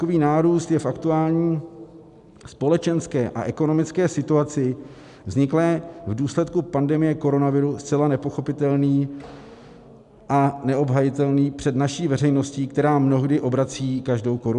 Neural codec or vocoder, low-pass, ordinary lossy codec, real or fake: none; 10.8 kHz; MP3, 96 kbps; real